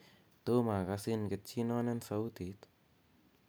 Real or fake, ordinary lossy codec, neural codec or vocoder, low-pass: real; none; none; none